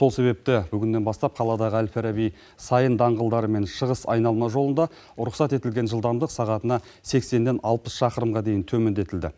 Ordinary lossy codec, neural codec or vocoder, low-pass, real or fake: none; none; none; real